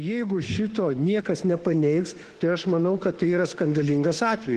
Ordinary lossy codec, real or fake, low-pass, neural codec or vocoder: Opus, 16 kbps; fake; 14.4 kHz; autoencoder, 48 kHz, 32 numbers a frame, DAC-VAE, trained on Japanese speech